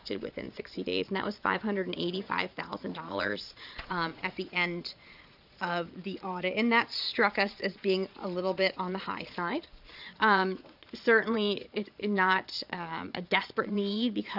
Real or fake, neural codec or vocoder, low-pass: fake; vocoder, 22.05 kHz, 80 mel bands, Vocos; 5.4 kHz